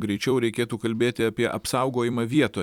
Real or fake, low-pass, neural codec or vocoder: fake; 19.8 kHz; vocoder, 44.1 kHz, 128 mel bands every 256 samples, BigVGAN v2